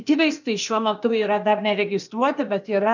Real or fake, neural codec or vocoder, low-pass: fake; codec, 16 kHz, 0.8 kbps, ZipCodec; 7.2 kHz